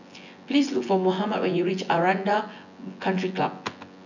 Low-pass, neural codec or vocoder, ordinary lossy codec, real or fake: 7.2 kHz; vocoder, 24 kHz, 100 mel bands, Vocos; none; fake